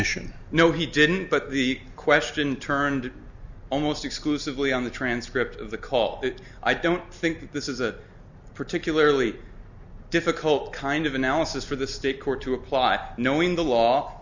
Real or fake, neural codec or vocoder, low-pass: real; none; 7.2 kHz